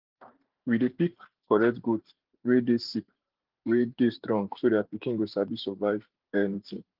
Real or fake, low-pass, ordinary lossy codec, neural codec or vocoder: fake; 5.4 kHz; Opus, 32 kbps; vocoder, 44.1 kHz, 128 mel bands every 512 samples, BigVGAN v2